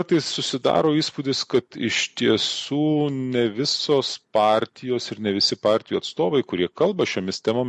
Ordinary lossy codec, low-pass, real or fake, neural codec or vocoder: MP3, 48 kbps; 10.8 kHz; real; none